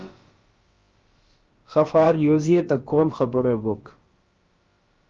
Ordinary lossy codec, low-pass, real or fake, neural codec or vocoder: Opus, 32 kbps; 7.2 kHz; fake; codec, 16 kHz, about 1 kbps, DyCAST, with the encoder's durations